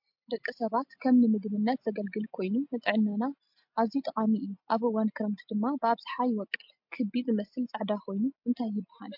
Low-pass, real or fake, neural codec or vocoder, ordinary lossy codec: 5.4 kHz; real; none; MP3, 48 kbps